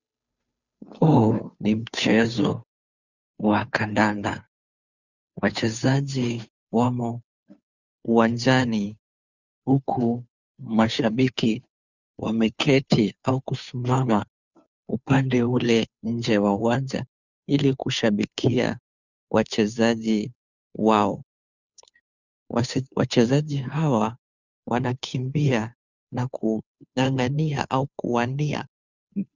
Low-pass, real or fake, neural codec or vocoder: 7.2 kHz; fake; codec, 16 kHz, 2 kbps, FunCodec, trained on Chinese and English, 25 frames a second